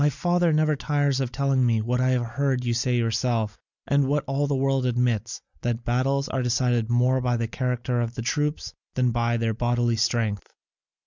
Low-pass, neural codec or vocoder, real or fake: 7.2 kHz; none; real